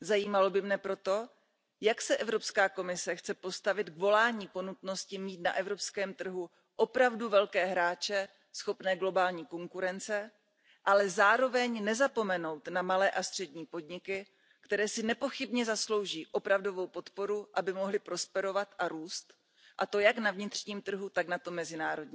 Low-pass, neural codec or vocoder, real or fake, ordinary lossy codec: none; none; real; none